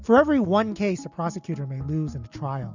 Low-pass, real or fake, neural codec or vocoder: 7.2 kHz; real; none